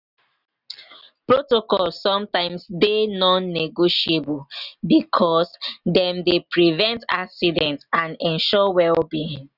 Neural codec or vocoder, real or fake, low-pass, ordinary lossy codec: none; real; 5.4 kHz; none